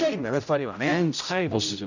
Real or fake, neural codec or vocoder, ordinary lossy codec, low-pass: fake; codec, 16 kHz, 0.5 kbps, X-Codec, HuBERT features, trained on general audio; none; 7.2 kHz